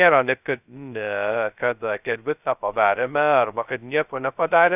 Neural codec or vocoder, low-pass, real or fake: codec, 16 kHz, 0.2 kbps, FocalCodec; 3.6 kHz; fake